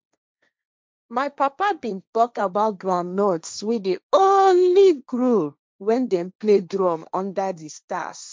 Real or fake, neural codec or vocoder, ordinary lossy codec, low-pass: fake; codec, 16 kHz, 1.1 kbps, Voila-Tokenizer; none; none